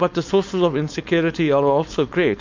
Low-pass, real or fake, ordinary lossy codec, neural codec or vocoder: 7.2 kHz; fake; MP3, 64 kbps; codec, 16 kHz, 4.8 kbps, FACodec